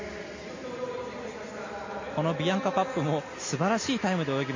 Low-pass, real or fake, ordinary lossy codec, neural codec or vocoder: 7.2 kHz; real; MP3, 32 kbps; none